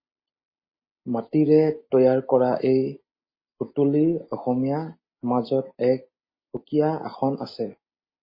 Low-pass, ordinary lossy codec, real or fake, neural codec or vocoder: 5.4 kHz; MP3, 24 kbps; real; none